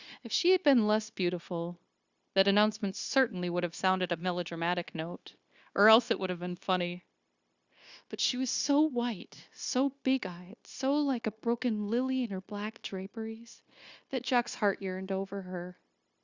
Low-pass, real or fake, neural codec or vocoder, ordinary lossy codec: 7.2 kHz; fake; codec, 16 kHz, 0.9 kbps, LongCat-Audio-Codec; Opus, 64 kbps